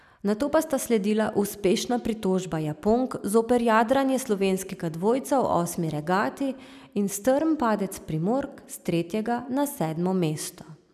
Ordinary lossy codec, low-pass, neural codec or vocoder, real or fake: none; 14.4 kHz; none; real